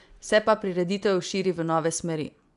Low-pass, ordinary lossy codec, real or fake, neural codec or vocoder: 10.8 kHz; none; fake; vocoder, 24 kHz, 100 mel bands, Vocos